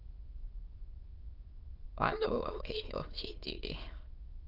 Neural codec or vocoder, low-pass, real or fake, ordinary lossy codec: autoencoder, 22.05 kHz, a latent of 192 numbers a frame, VITS, trained on many speakers; 5.4 kHz; fake; Opus, 32 kbps